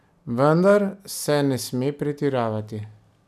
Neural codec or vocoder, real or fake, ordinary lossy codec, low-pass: none; real; none; 14.4 kHz